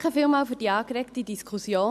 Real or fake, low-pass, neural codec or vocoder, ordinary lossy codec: real; 14.4 kHz; none; none